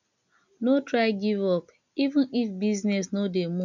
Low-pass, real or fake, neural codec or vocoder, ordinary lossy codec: 7.2 kHz; real; none; none